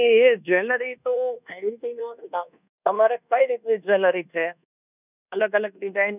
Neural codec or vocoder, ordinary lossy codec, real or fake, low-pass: codec, 24 kHz, 1.2 kbps, DualCodec; none; fake; 3.6 kHz